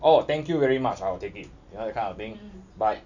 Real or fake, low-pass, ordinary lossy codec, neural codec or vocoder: real; 7.2 kHz; none; none